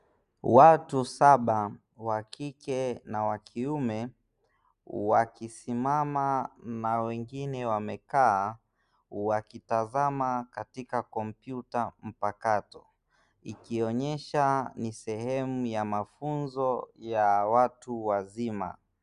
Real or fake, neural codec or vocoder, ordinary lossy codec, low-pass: real; none; Opus, 64 kbps; 10.8 kHz